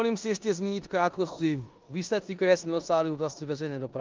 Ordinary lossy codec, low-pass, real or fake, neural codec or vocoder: Opus, 24 kbps; 7.2 kHz; fake; codec, 16 kHz in and 24 kHz out, 0.9 kbps, LongCat-Audio-Codec, four codebook decoder